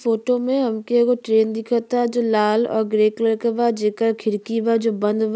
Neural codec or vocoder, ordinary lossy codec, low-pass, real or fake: none; none; none; real